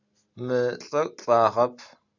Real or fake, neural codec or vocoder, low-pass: real; none; 7.2 kHz